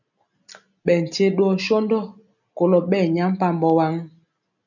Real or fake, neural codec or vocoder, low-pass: real; none; 7.2 kHz